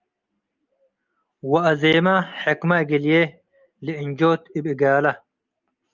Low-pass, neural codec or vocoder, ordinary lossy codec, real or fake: 7.2 kHz; none; Opus, 24 kbps; real